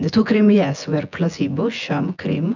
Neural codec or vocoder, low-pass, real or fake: vocoder, 24 kHz, 100 mel bands, Vocos; 7.2 kHz; fake